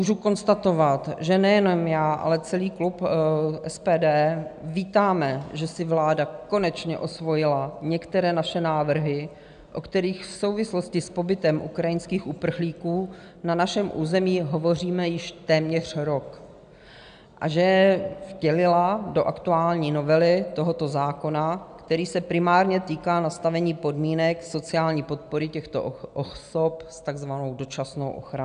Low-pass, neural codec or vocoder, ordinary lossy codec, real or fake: 9.9 kHz; none; AAC, 96 kbps; real